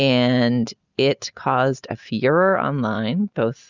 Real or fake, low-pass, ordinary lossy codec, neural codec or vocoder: real; 7.2 kHz; Opus, 64 kbps; none